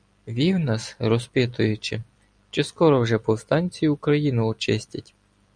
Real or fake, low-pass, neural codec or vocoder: fake; 9.9 kHz; vocoder, 44.1 kHz, 128 mel bands every 256 samples, BigVGAN v2